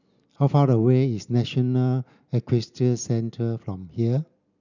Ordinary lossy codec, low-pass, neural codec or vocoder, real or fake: none; 7.2 kHz; none; real